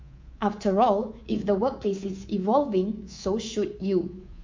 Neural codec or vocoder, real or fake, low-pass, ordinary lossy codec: codec, 24 kHz, 3.1 kbps, DualCodec; fake; 7.2 kHz; MP3, 48 kbps